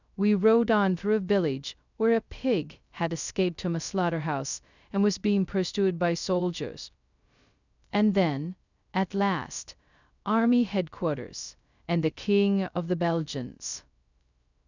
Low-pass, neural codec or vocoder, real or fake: 7.2 kHz; codec, 16 kHz, 0.2 kbps, FocalCodec; fake